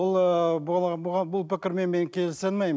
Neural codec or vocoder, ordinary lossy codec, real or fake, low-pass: none; none; real; none